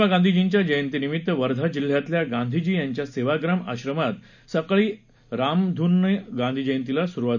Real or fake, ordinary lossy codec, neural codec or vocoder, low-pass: real; none; none; 7.2 kHz